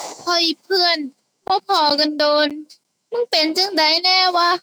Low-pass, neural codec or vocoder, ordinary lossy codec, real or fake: none; autoencoder, 48 kHz, 128 numbers a frame, DAC-VAE, trained on Japanese speech; none; fake